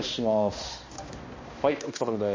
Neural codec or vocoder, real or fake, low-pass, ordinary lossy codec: codec, 16 kHz, 1 kbps, X-Codec, HuBERT features, trained on balanced general audio; fake; 7.2 kHz; MP3, 32 kbps